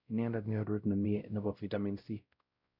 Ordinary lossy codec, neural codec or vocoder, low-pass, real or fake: none; codec, 16 kHz, 0.5 kbps, X-Codec, WavLM features, trained on Multilingual LibriSpeech; 5.4 kHz; fake